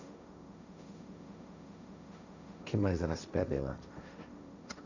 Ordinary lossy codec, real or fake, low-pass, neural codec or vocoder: none; fake; 7.2 kHz; codec, 16 kHz, 1.1 kbps, Voila-Tokenizer